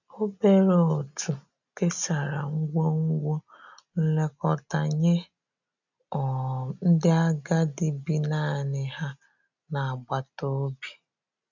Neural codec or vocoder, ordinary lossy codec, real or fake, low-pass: none; none; real; 7.2 kHz